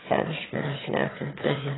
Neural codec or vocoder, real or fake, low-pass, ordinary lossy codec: autoencoder, 22.05 kHz, a latent of 192 numbers a frame, VITS, trained on one speaker; fake; 7.2 kHz; AAC, 16 kbps